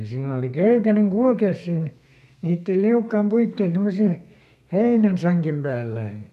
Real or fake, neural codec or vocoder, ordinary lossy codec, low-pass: fake; codec, 32 kHz, 1.9 kbps, SNAC; none; 14.4 kHz